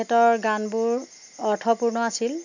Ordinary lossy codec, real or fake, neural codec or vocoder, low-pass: none; real; none; 7.2 kHz